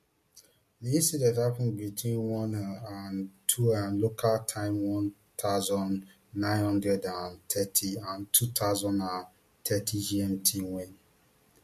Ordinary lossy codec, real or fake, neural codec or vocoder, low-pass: MP3, 64 kbps; real; none; 14.4 kHz